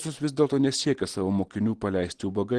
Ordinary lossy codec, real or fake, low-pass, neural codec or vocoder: Opus, 16 kbps; real; 10.8 kHz; none